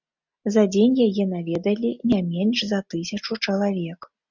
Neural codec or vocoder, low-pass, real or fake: none; 7.2 kHz; real